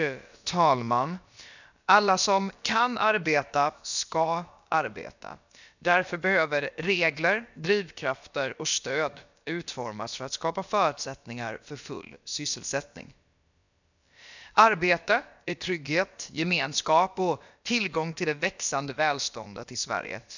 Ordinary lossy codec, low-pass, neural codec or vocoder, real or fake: none; 7.2 kHz; codec, 16 kHz, about 1 kbps, DyCAST, with the encoder's durations; fake